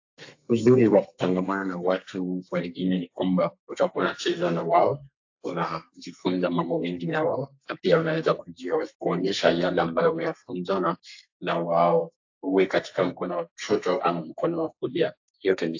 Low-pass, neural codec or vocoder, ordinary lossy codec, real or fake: 7.2 kHz; codec, 32 kHz, 1.9 kbps, SNAC; AAC, 48 kbps; fake